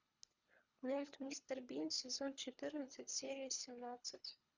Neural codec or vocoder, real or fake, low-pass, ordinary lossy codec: codec, 24 kHz, 3 kbps, HILCodec; fake; 7.2 kHz; Opus, 64 kbps